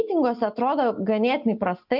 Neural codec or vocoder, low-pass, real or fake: none; 5.4 kHz; real